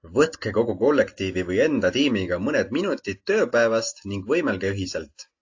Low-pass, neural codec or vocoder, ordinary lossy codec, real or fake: 7.2 kHz; none; AAC, 48 kbps; real